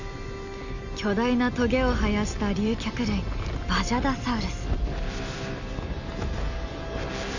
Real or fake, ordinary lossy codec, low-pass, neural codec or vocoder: real; none; 7.2 kHz; none